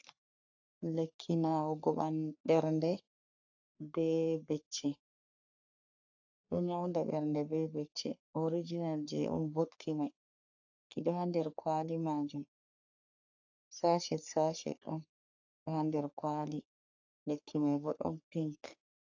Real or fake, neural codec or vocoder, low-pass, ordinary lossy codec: fake; codec, 44.1 kHz, 3.4 kbps, Pupu-Codec; 7.2 kHz; AAC, 48 kbps